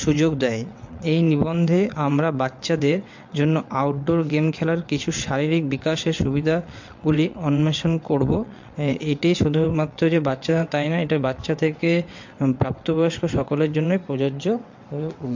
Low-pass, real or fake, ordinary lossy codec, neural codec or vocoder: 7.2 kHz; fake; MP3, 48 kbps; vocoder, 22.05 kHz, 80 mel bands, WaveNeXt